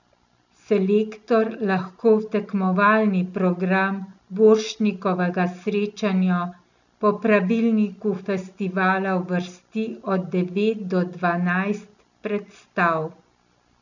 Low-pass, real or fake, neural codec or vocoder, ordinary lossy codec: 7.2 kHz; real; none; none